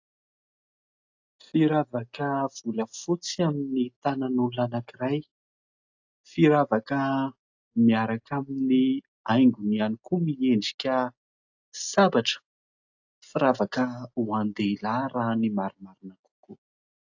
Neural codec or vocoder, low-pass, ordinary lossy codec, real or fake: none; 7.2 kHz; AAC, 48 kbps; real